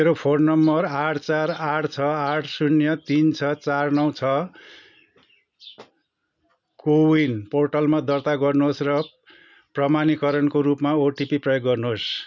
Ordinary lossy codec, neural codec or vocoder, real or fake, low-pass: none; none; real; 7.2 kHz